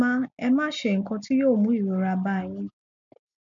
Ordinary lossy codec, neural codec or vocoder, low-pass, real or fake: AAC, 64 kbps; none; 7.2 kHz; real